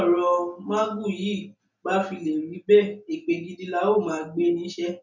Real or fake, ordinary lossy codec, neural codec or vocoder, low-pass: real; none; none; 7.2 kHz